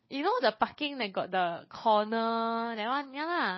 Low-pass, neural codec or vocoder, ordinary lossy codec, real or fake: 7.2 kHz; codec, 16 kHz, 4 kbps, FunCodec, trained on LibriTTS, 50 frames a second; MP3, 24 kbps; fake